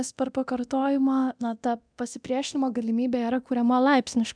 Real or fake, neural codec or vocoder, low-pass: fake; codec, 24 kHz, 0.9 kbps, DualCodec; 9.9 kHz